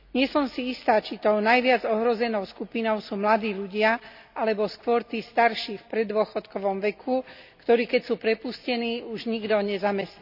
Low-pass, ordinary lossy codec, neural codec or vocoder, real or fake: 5.4 kHz; none; none; real